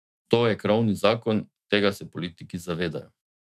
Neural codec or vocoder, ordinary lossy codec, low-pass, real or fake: autoencoder, 48 kHz, 128 numbers a frame, DAC-VAE, trained on Japanese speech; none; 14.4 kHz; fake